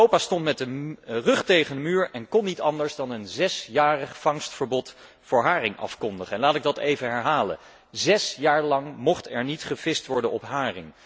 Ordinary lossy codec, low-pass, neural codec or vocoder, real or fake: none; none; none; real